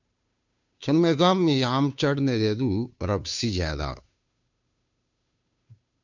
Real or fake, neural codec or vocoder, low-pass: fake; codec, 16 kHz, 2 kbps, FunCodec, trained on Chinese and English, 25 frames a second; 7.2 kHz